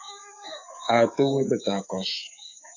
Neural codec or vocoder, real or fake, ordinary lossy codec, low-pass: codec, 24 kHz, 3.1 kbps, DualCodec; fake; AAC, 48 kbps; 7.2 kHz